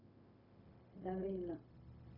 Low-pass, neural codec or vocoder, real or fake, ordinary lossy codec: 5.4 kHz; codec, 16 kHz, 0.4 kbps, LongCat-Audio-Codec; fake; AAC, 24 kbps